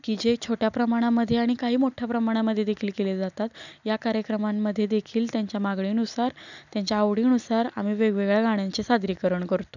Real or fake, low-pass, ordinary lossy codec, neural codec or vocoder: real; 7.2 kHz; none; none